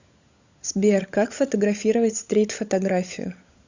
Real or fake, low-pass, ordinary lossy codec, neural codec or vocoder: fake; 7.2 kHz; Opus, 64 kbps; codec, 16 kHz, 16 kbps, FunCodec, trained on LibriTTS, 50 frames a second